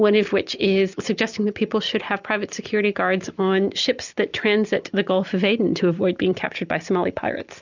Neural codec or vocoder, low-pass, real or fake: none; 7.2 kHz; real